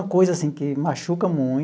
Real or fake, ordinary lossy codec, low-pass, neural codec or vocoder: real; none; none; none